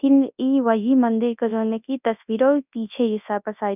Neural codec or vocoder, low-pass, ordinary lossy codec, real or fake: codec, 24 kHz, 0.9 kbps, WavTokenizer, large speech release; 3.6 kHz; none; fake